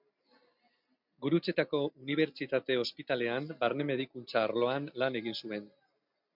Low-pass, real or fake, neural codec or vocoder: 5.4 kHz; real; none